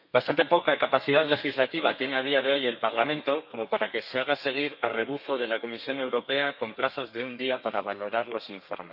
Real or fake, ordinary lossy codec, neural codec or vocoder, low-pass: fake; none; codec, 32 kHz, 1.9 kbps, SNAC; 5.4 kHz